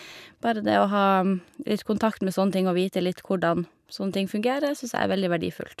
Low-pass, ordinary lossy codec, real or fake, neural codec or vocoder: 14.4 kHz; none; real; none